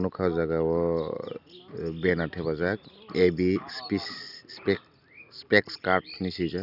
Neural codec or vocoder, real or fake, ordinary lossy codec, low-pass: none; real; none; 5.4 kHz